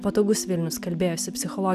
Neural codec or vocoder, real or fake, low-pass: none; real; 14.4 kHz